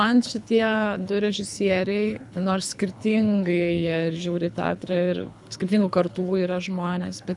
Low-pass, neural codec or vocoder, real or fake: 10.8 kHz; codec, 24 kHz, 3 kbps, HILCodec; fake